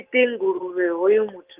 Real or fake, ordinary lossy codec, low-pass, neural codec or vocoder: real; Opus, 24 kbps; 3.6 kHz; none